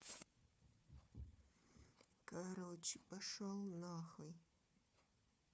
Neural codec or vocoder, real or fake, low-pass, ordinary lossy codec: codec, 16 kHz, 4 kbps, FunCodec, trained on Chinese and English, 50 frames a second; fake; none; none